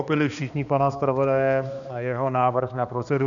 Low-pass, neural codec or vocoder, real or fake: 7.2 kHz; codec, 16 kHz, 2 kbps, X-Codec, HuBERT features, trained on balanced general audio; fake